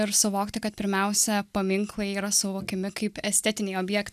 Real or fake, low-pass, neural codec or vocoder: real; 14.4 kHz; none